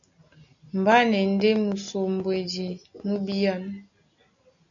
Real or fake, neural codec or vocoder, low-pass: real; none; 7.2 kHz